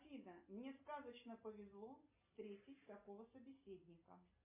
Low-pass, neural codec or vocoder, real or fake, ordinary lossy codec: 3.6 kHz; none; real; MP3, 24 kbps